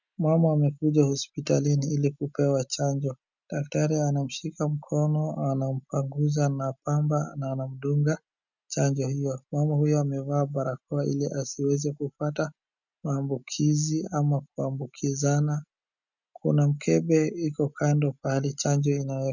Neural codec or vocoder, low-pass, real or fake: none; 7.2 kHz; real